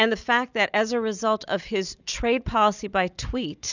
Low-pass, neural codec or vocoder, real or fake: 7.2 kHz; none; real